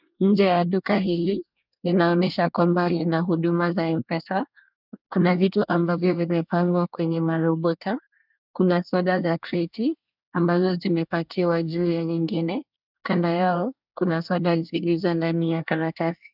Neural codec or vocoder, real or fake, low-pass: codec, 24 kHz, 1 kbps, SNAC; fake; 5.4 kHz